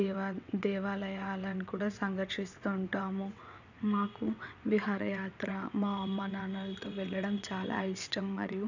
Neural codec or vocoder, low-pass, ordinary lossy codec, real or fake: vocoder, 22.05 kHz, 80 mel bands, WaveNeXt; 7.2 kHz; none; fake